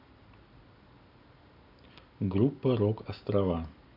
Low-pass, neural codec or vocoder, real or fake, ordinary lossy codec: 5.4 kHz; none; real; none